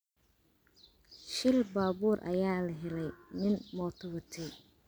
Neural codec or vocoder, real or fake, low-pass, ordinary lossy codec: none; real; none; none